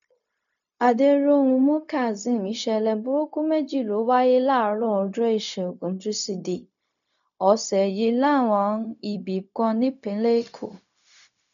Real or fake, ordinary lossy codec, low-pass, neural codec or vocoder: fake; none; 7.2 kHz; codec, 16 kHz, 0.4 kbps, LongCat-Audio-Codec